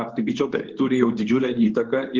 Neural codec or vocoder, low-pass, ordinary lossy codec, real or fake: codec, 24 kHz, 0.9 kbps, WavTokenizer, medium speech release version 2; 7.2 kHz; Opus, 16 kbps; fake